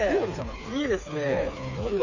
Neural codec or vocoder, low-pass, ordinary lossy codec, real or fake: codec, 16 kHz, 4 kbps, FreqCodec, smaller model; 7.2 kHz; none; fake